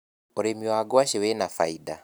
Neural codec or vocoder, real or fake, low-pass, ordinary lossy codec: none; real; none; none